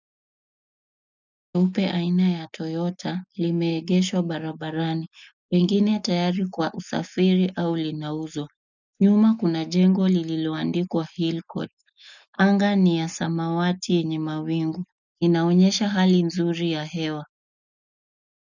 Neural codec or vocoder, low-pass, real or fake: none; 7.2 kHz; real